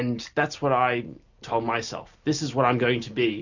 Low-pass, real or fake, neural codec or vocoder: 7.2 kHz; real; none